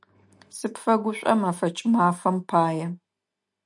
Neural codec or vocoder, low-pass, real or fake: none; 10.8 kHz; real